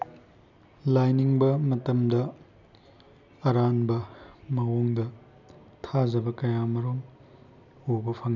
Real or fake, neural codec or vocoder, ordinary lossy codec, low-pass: real; none; none; 7.2 kHz